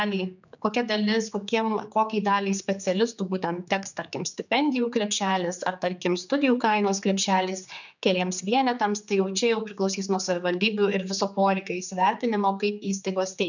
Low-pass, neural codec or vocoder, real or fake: 7.2 kHz; codec, 16 kHz, 4 kbps, X-Codec, HuBERT features, trained on general audio; fake